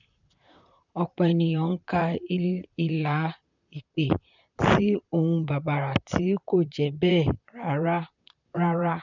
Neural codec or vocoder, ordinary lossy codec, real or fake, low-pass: vocoder, 44.1 kHz, 128 mel bands, Pupu-Vocoder; none; fake; 7.2 kHz